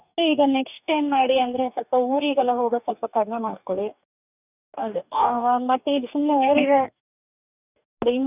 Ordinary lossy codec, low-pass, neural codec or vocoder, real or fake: none; 3.6 kHz; codec, 44.1 kHz, 2.6 kbps, DAC; fake